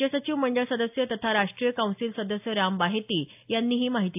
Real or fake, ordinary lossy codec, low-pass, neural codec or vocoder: real; AAC, 32 kbps; 3.6 kHz; none